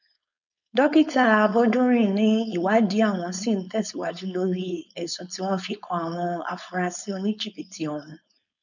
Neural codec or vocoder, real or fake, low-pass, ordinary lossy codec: codec, 16 kHz, 4.8 kbps, FACodec; fake; 7.2 kHz; none